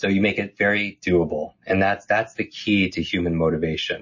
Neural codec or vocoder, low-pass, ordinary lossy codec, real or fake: none; 7.2 kHz; MP3, 32 kbps; real